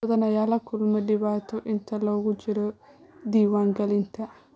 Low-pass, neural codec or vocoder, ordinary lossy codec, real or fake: none; none; none; real